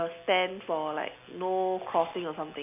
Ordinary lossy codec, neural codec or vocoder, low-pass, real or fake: none; none; 3.6 kHz; real